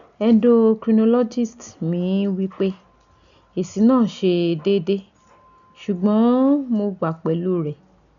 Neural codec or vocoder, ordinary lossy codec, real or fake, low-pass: none; none; real; 7.2 kHz